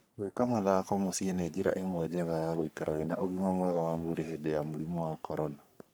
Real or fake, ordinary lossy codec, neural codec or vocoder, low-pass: fake; none; codec, 44.1 kHz, 3.4 kbps, Pupu-Codec; none